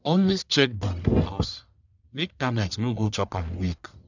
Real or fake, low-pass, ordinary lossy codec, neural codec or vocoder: fake; 7.2 kHz; none; codec, 44.1 kHz, 1.7 kbps, Pupu-Codec